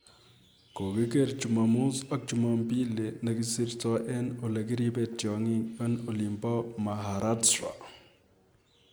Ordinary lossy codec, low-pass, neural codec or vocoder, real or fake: none; none; none; real